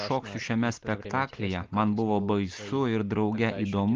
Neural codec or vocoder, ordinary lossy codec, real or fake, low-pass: none; Opus, 32 kbps; real; 7.2 kHz